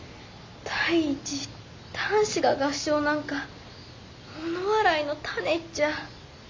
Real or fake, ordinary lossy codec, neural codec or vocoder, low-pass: real; MP3, 48 kbps; none; 7.2 kHz